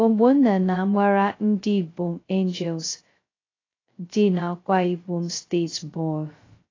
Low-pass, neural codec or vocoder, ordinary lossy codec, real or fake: 7.2 kHz; codec, 16 kHz, 0.2 kbps, FocalCodec; AAC, 32 kbps; fake